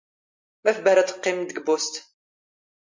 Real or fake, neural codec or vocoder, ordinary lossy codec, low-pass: real; none; MP3, 48 kbps; 7.2 kHz